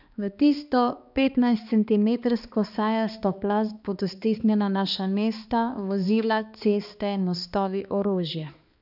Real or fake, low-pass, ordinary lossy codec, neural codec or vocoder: fake; 5.4 kHz; none; codec, 16 kHz, 2 kbps, X-Codec, HuBERT features, trained on balanced general audio